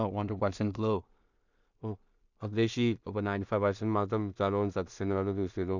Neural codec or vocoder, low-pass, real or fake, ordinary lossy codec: codec, 16 kHz in and 24 kHz out, 0.4 kbps, LongCat-Audio-Codec, two codebook decoder; 7.2 kHz; fake; none